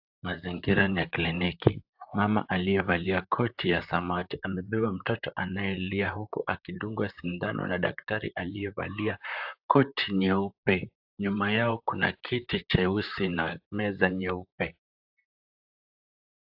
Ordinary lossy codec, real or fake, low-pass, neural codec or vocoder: AAC, 48 kbps; fake; 5.4 kHz; vocoder, 44.1 kHz, 128 mel bands, Pupu-Vocoder